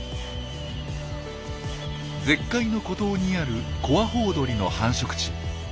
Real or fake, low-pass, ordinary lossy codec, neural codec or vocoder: real; none; none; none